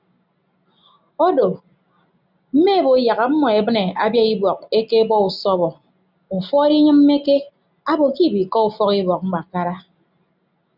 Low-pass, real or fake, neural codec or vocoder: 5.4 kHz; real; none